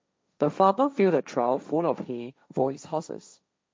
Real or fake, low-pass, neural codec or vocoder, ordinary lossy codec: fake; none; codec, 16 kHz, 1.1 kbps, Voila-Tokenizer; none